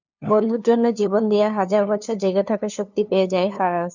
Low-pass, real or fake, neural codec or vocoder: 7.2 kHz; fake; codec, 16 kHz, 2 kbps, FunCodec, trained on LibriTTS, 25 frames a second